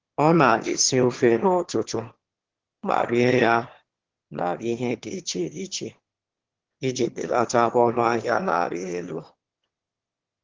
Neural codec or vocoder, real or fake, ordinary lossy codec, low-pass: autoencoder, 22.05 kHz, a latent of 192 numbers a frame, VITS, trained on one speaker; fake; Opus, 16 kbps; 7.2 kHz